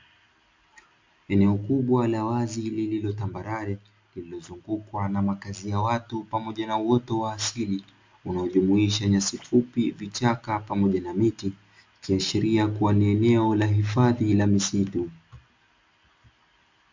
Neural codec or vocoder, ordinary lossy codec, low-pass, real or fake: none; MP3, 64 kbps; 7.2 kHz; real